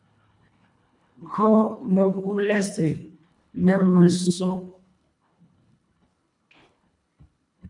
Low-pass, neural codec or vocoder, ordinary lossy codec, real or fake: 10.8 kHz; codec, 24 kHz, 1.5 kbps, HILCodec; MP3, 96 kbps; fake